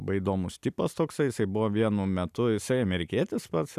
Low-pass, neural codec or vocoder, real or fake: 14.4 kHz; none; real